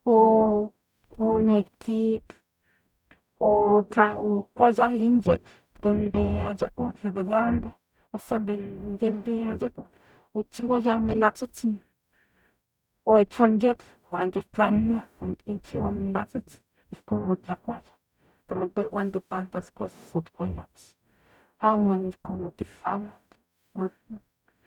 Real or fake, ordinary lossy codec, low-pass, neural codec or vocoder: fake; none; 19.8 kHz; codec, 44.1 kHz, 0.9 kbps, DAC